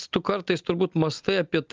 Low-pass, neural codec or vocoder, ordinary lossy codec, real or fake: 7.2 kHz; none; Opus, 24 kbps; real